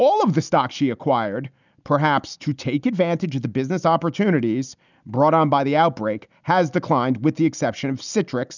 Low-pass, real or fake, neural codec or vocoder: 7.2 kHz; real; none